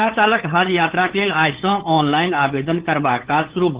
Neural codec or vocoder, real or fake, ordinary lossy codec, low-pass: codec, 16 kHz, 8 kbps, FunCodec, trained on LibriTTS, 25 frames a second; fake; Opus, 16 kbps; 3.6 kHz